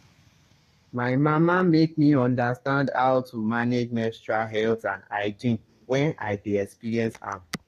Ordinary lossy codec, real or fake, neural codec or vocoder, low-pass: AAC, 48 kbps; fake; codec, 32 kHz, 1.9 kbps, SNAC; 14.4 kHz